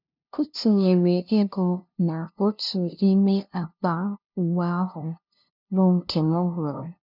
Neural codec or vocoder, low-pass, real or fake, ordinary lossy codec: codec, 16 kHz, 0.5 kbps, FunCodec, trained on LibriTTS, 25 frames a second; 5.4 kHz; fake; none